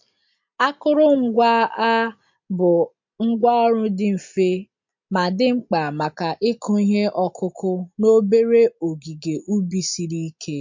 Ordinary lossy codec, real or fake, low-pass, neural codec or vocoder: MP3, 48 kbps; real; 7.2 kHz; none